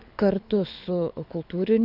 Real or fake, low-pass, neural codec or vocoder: fake; 5.4 kHz; codec, 24 kHz, 6 kbps, HILCodec